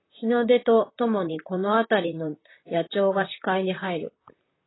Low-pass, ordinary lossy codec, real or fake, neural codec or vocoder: 7.2 kHz; AAC, 16 kbps; fake; vocoder, 44.1 kHz, 128 mel bands, Pupu-Vocoder